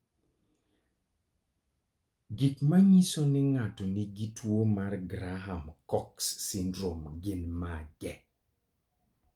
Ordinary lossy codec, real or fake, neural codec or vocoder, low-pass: Opus, 32 kbps; real; none; 19.8 kHz